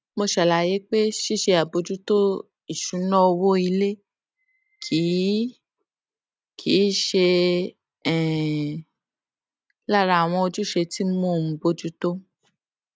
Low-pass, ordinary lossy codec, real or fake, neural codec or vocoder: none; none; real; none